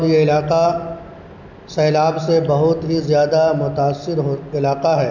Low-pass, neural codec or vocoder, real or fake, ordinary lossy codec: 7.2 kHz; none; real; none